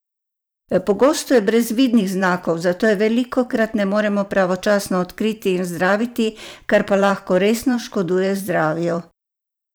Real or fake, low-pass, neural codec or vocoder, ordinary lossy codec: fake; none; vocoder, 44.1 kHz, 128 mel bands every 512 samples, BigVGAN v2; none